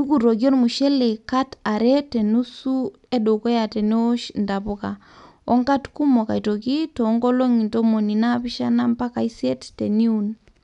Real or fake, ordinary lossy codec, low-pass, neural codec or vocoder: real; none; 10.8 kHz; none